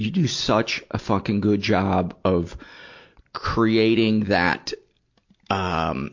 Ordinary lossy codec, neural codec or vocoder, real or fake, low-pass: MP3, 48 kbps; none; real; 7.2 kHz